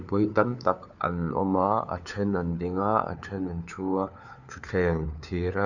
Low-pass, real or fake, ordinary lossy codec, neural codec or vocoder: 7.2 kHz; fake; none; codec, 16 kHz, 4 kbps, FunCodec, trained on LibriTTS, 50 frames a second